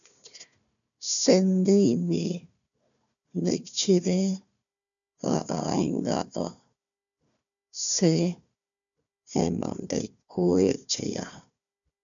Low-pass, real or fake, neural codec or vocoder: 7.2 kHz; fake; codec, 16 kHz, 1 kbps, FunCodec, trained on Chinese and English, 50 frames a second